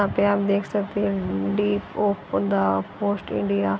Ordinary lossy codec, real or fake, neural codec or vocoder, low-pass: none; real; none; none